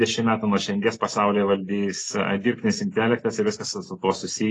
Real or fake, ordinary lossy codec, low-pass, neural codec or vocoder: real; AAC, 32 kbps; 10.8 kHz; none